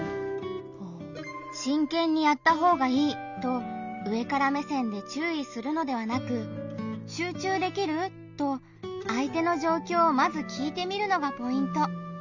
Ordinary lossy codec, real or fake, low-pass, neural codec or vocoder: none; real; 7.2 kHz; none